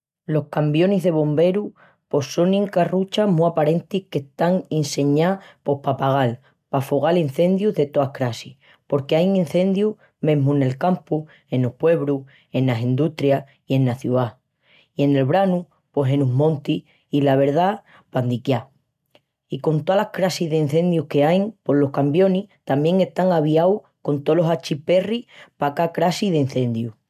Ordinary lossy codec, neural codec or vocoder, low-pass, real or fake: MP3, 96 kbps; none; 14.4 kHz; real